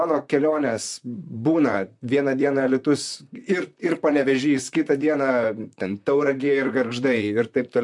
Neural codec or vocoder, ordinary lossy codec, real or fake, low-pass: vocoder, 44.1 kHz, 128 mel bands, Pupu-Vocoder; MP3, 64 kbps; fake; 10.8 kHz